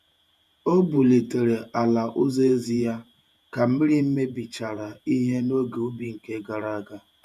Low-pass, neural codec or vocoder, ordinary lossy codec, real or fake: 14.4 kHz; vocoder, 48 kHz, 128 mel bands, Vocos; none; fake